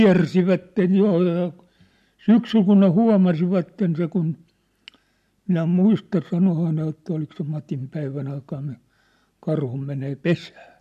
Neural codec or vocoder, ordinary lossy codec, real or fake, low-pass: none; MP3, 64 kbps; real; 14.4 kHz